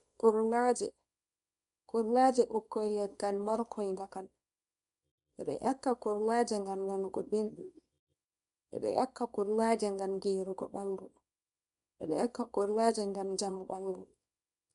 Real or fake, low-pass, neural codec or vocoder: fake; 10.8 kHz; codec, 24 kHz, 0.9 kbps, WavTokenizer, small release